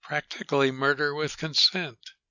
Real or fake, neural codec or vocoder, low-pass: real; none; 7.2 kHz